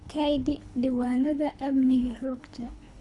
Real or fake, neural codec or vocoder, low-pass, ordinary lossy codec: fake; codec, 24 kHz, 3 kbps, HILCodec; 10.8 kHz; none